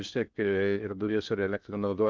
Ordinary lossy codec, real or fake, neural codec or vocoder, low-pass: Opus, 24 kbps; fake; codec, 16 kHz in and 24 kHz out, 0.6 kbps, FocalCodec, streaming, 2048 codes; 7.2 kHz